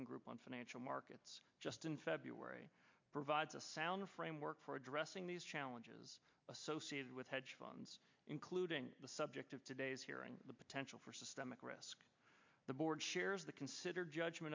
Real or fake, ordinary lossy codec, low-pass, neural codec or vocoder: real; MP3, 64 kbps; 7.2 kHz; none